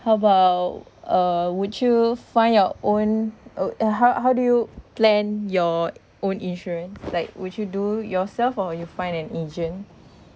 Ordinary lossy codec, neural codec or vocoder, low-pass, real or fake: none; none; none; real